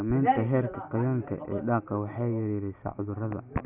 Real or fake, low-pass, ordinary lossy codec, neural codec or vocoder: real; 3.6 kHz; none; none